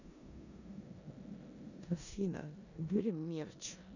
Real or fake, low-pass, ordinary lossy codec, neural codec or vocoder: fake; 7.2 kHz; none; codec, 16 kHz in and 24 kHz out, 0.9 kbps, LongCat-Audio-Codec, four codebook decoder